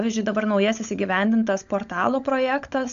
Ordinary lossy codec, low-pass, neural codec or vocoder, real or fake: AAC, 64 kbps; 7.2 kHz; codec, 16 kHz, 16 kbps, FunCodec, trained on LibriTTS, 50 frames a second; fake